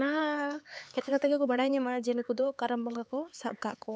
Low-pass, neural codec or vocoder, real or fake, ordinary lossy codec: none; codec, 16 kHz, 4 kbps, X-Codec, HuBERT features, trained on LibriSpeech; fake; none